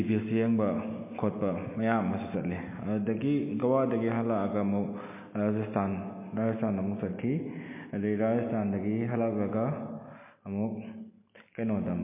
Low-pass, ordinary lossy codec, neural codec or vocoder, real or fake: 3.6 kHz; MP3, 24 kbps; none; real